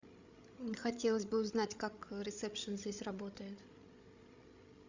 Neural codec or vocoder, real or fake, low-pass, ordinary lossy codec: codec, 16 kHz, 16 kbps, FreqCodec, larger model; fake; 7.2 kHz; Opus, 64 kbps